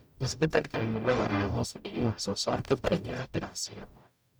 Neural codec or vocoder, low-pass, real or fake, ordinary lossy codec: codec, 44.1 kHz, 0.9 kbps, DAC; none; fake; none